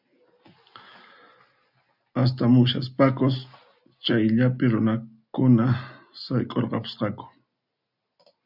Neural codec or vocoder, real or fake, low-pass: none; real; 5.4 kHz